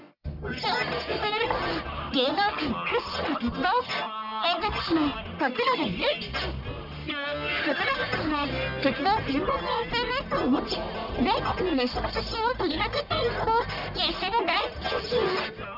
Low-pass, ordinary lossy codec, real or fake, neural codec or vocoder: 5.4 kHz; none; fake; codec, 44.1 kHz, 1.7 kbps, Pupu-Codec